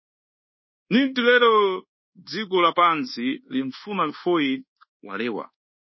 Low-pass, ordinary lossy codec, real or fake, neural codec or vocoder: 7.2 kHz; MP3, 24 kbps; fake; codec, 24 kHz, 1.2 kbps, DualCodec